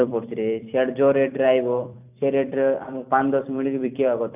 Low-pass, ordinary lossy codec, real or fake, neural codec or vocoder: 3.6 kHz; none; fake; vocoder, 44.1 kHz, 128 mel bands every 256 samples, BigVGAN v2